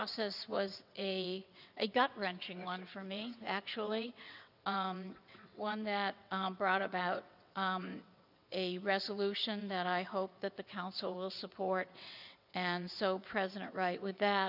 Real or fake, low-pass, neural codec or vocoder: fake; 5.4 kHz; vocoder, 22.05 kHz, 80 mel bands, WaveNeXt